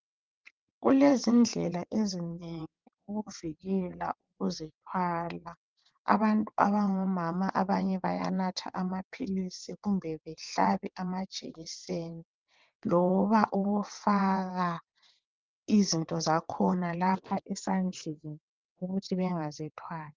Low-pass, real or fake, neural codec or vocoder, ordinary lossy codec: 7.2 kHz; fake; vocoder, 22.05 kHz, 80 mel bands, WaveNeXt; Opus, 24 kbps